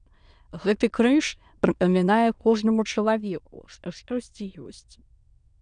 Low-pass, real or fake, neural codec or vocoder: 9.9 kHz; fake; autoencoder, 22.05 kHz, a latent of 192 numbers a frame, VITS, trained on many speakers